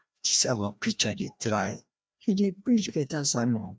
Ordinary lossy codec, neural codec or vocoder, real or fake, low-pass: none; codec, 16 kHz, 1 kbps, FreqCodec, larger model; fake; none